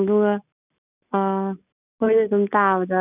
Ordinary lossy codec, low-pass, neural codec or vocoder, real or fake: none; 3.6 kHz; none; real